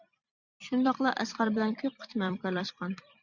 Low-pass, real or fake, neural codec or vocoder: 7.2 kHz; fake; vocoder, 44.1 kHz, 128 mel bands every 256 samples, BigVGAN v2